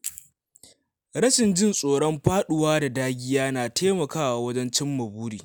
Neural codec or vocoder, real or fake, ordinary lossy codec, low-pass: none; real; none; none